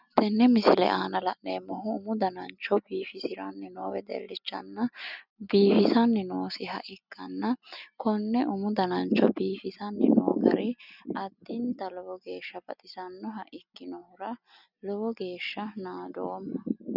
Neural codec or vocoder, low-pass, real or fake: none; 5.4 kHz; real